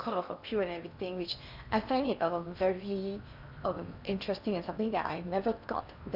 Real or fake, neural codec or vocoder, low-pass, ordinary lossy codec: fake; codec, 16 kHz in and 24 kHz out, 0.8 kbps, FocalCodec, streaming, 65536 codes; 5.4 kHz; none